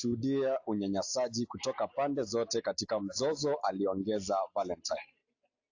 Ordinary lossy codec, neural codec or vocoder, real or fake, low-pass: AAC, 48 kbps; none; real; 7.2 kHz